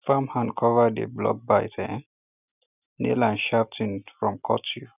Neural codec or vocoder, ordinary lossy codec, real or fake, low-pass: none; none; real; 3.6 kHz